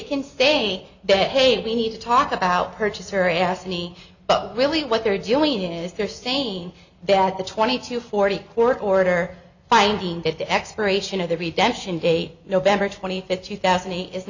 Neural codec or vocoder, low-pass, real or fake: none; 7.2 kHz; real